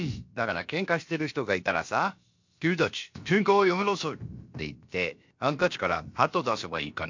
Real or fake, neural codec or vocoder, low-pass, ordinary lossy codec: fake; codec, 16 kHz, about 1 kbps, DyCAST, with the encoder's durations; 7.2 kHz; MP3, 48 kbps